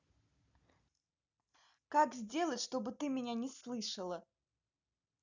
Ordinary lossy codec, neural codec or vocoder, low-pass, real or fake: none; none; 7.2 kHz; real